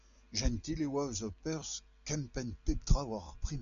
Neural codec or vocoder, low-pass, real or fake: none; 7.2 kHz; real